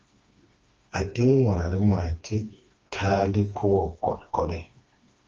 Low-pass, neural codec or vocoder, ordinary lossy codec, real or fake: 7.2 kHz; codec, 16 kHz, 2 kbps, FreqCodec, smaller model; Opus, 24 kbps; fake